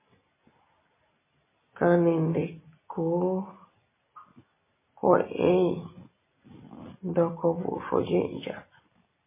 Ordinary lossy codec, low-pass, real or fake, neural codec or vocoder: MP3, 16 kbps; 3.6 kHz; real; none